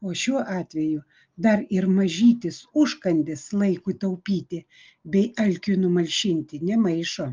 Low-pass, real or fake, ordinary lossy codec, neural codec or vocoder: 7.2 kHz; real; Opus, 32 kbps; none